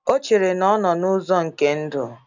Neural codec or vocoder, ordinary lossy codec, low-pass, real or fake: none; none; 7.2 kHz; real